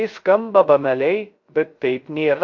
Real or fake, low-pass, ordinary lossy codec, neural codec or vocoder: fake; 7.2 kHz; MP3, 48 kbps; codec, 16 kHz, 0.3 kbps, FocalCodec